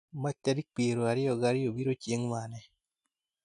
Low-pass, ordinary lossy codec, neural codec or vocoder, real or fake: 10.8 kHz; none; none; real